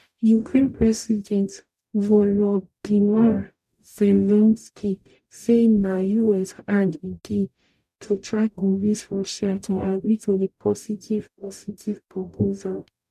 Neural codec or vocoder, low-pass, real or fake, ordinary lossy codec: codec, 44.1 kHz, 0.9 kbps, DAC; 14.4 kHz; fake; none